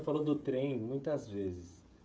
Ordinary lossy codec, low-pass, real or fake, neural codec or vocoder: none; none; fake; codec, 16 kHz, 16 kbps, FreqCodec, smaller model